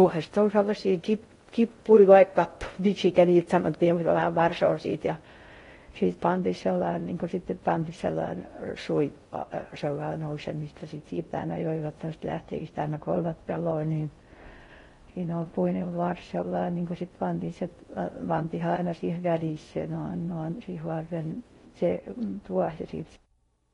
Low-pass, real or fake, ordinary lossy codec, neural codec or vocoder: 10.8 kHz; fake; AAC, 32 kbps; codec, 16 kHz in and 24 kHz out, 0.6 kbps, FocalCodec, streaming, 2048 codes